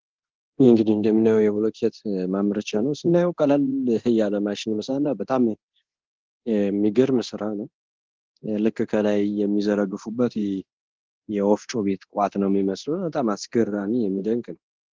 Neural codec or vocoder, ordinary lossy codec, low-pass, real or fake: codec, 24 kHz, 0.9 kbps, DualCodec; Opus, 16 kbps; 7.2 kHz; fake